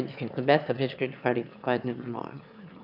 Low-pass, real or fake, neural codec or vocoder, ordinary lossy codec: 5.4 kHz; fake; autoencoder, 22.05 kHz, a latent of 192 numbers a frame, VITS, trained on one speaker; none